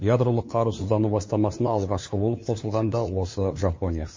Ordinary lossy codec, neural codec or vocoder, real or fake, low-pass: MP3, 32 kbps; codec, 16 kHz, 2 kbps, FunCodec, trained on LibriTTS, 25 frames a second; fake; 7.2 kHz